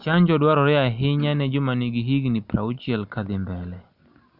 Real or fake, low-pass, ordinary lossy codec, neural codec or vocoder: real; 5.4 kHz; Opus, 64 kbps; none